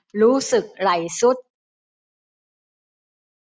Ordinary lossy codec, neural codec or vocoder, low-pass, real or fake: none; none; none; real